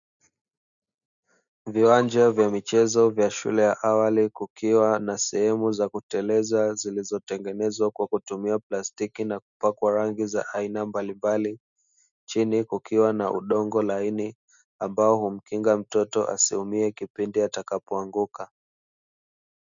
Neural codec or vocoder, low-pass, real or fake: none; 7.2 kHz; real